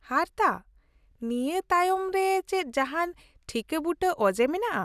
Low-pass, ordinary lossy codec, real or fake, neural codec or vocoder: 14.4 kHz; none; real; none